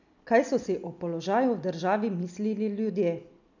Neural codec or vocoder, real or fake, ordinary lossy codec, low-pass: none; real; none; 7.2 kHz